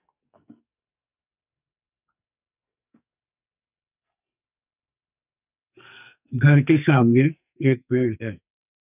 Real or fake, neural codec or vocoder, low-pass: fake; codec, 44.1 kHz, 2.6 kbps, SNAC; 3.6 kHz